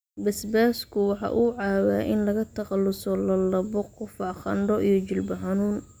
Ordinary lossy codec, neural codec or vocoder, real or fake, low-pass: none; none; real; none